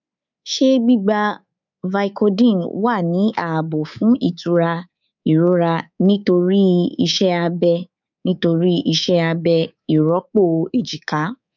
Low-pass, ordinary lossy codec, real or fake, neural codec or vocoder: 7.2 kHz; none; fake; codec, 24 kHz, 3.1 kbps, DualCodec